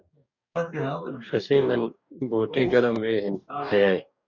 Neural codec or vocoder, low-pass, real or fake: codec, 44.1 kHz, 2.6 kbps, DAC; 7.2 kHz; fake